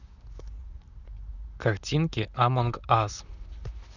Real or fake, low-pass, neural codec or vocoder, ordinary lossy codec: fake; 7.2 kHz; vocoder, 44.1 kHz, 128 mel bands, Pupu-Vocoder; none